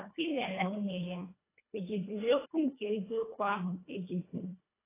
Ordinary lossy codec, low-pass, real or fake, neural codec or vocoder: AAC, 16 kbps; 3.6 kHz; fake; codec, 24 kHz, 1.5 kbps, HILCodec